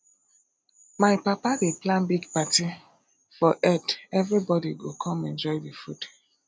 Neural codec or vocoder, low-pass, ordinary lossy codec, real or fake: none; none; none; real